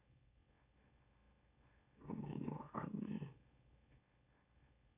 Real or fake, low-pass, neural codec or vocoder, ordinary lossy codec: fake; 3.6 kHz; autoencoder, 44.1 kHz, a latent of 192 numbers a frame, MeloTTS; none